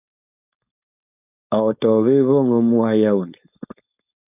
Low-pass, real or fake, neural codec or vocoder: 3.6 kHz; fake; codec, 16 kHz, 4.8 kbps, FACodec